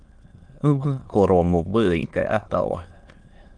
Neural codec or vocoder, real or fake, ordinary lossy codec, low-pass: autoencoder, 22.05 kHz, a latent of 192 numbers a frame, VITS, trained on many speakers; fake; Opus, 24 kbps; 9.9 kHz